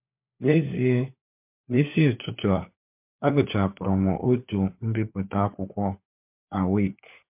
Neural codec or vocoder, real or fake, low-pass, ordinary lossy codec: codec, 16 kHz, 4 kbps, FunCodec, trained on LibriTTS, 50 frames a second; fake; 3.6 kHz; MP3, 32 kbps